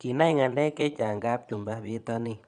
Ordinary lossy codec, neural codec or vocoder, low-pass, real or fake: none; vocoder, 22.05 kHz, 80 mel bands, Vocos; 9.9 kHz; fake